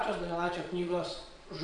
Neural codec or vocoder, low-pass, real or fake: vocoder, 22.05 kHz, 80 mel bands, Vocos; 9.9 kHz; fake